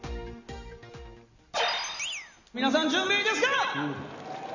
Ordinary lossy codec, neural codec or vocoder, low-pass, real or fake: none; none; 7.2 kHz; real